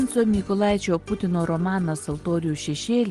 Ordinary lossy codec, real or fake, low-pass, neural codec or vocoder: Opus, 24 kbps; real; 10.8 kHz; none